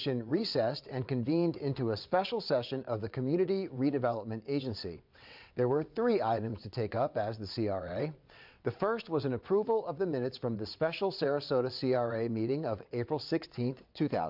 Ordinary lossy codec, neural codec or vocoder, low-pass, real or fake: MP3, 48 kbps; vocoder, 22.05 kHz, 80 mel bands, WaveNeXt; 5.4 kHz; fake